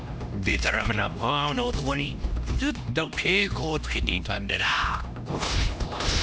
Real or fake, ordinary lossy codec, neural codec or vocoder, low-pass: fake; none; codec, 16 kHz, 1 kbps, X-Codec, HuBERT features, trained on LibriSpeech; none